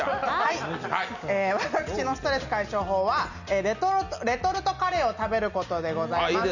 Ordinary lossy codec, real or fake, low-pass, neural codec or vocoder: none; real; 7.2 kHz; none